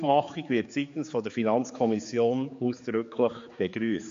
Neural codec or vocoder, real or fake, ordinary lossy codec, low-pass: codec, 16 kHz, 4 kbps, X-Codec, HuBERT features, trained on general audio; fake; MP3, 48 kbps; 7.2 kHz